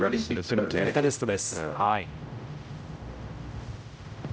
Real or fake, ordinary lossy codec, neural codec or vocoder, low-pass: fake; none; codec, 16 kHz, 0.5 kbps, X-Codec, HuBERT features, trained on general audio; none